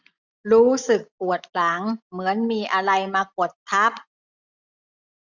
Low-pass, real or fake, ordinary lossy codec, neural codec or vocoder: 7.2 kHz; real; none; none